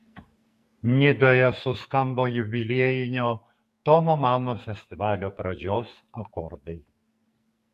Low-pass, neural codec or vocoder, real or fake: 14.4 kHz; codec, 44.1 kHz, 2.6 kbps, SNAC; fake